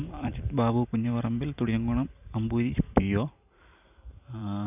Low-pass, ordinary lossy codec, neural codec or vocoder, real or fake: 3.6 kHz; none; vocoder, 44.1 kHz, 128 mel bands every 512 samples, BigVGAN v2; fake